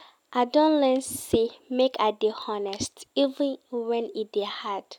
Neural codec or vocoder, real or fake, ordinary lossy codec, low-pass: none; real; none; 19.8 kHz